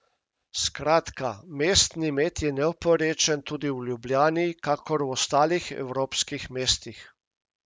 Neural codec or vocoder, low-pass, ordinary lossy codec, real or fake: none; none; none; real